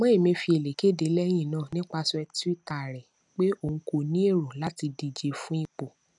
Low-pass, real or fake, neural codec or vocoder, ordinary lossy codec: 10.8 kHz; real; none; none